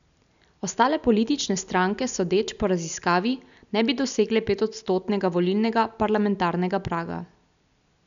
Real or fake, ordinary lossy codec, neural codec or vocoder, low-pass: real; none; none; 7.2 kHz